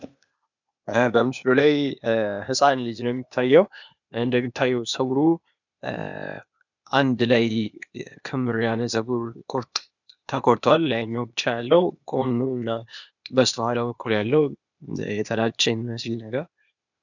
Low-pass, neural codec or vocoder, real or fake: 7.2 kHz; codec, 16 kHz, 0.8 kbps, ZipCodec; fake